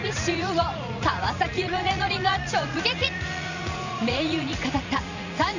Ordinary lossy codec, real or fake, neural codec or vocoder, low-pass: none; fake; vocoder, 44.1 kHz, 80 mel bands, Vocos; 7.2 kHz